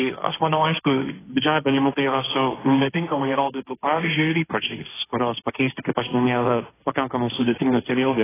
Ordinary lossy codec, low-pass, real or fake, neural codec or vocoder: AAC, 16 kbps; 3.6 kHz; fake; codec, 16 kHz, 1.1 kbps, Voila-Tokenizer